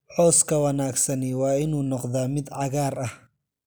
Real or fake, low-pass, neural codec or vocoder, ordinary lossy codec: real; none; none; none